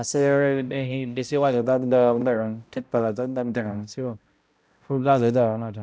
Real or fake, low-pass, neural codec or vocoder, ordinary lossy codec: fake; none; codec, 16 kHz, 0.5 kbps, X-Codec, HuBERT features, trained on balanced general audio; none